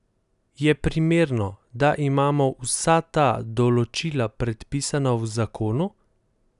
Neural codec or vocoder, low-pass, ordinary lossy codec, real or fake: none; 10.8 kHz; none; real